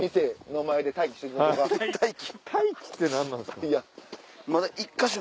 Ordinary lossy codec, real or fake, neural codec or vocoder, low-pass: none; real; none; none